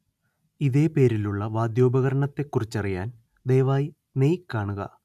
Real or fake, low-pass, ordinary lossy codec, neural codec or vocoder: real; 14.4 kHz; none; none